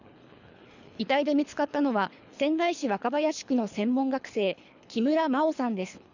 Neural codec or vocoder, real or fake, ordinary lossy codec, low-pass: codec, 24 kHz, 3 kbps, HILCodec; fake; none; 7.2 kHz